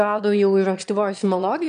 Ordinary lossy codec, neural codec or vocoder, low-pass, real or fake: MP3, 96 kbps; autoencoder, 22.05 kHz, a latent of 192 numbers a frame, VITS, trained on one speaker; 9.9 kHz; fake